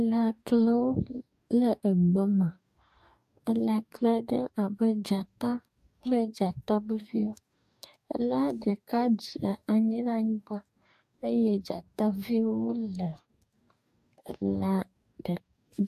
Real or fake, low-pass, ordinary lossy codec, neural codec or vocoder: fake; 14.4 kHz; AAC, 96 kbps; codec, 44.1 kHz, 2.6 kbps, DAC